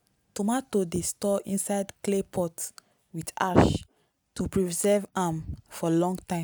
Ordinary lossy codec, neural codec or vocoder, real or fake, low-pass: none; none; real; none